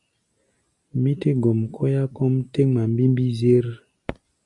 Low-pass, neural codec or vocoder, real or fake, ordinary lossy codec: 10.8 kHz; none; real; Opus, 64 kbps